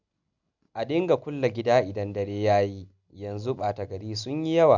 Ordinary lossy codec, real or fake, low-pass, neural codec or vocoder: none; real; 7.2 kHz; none